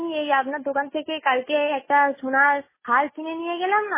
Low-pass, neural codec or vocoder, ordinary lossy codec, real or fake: 3.6 kHz; none; MP3, 16 kbps; real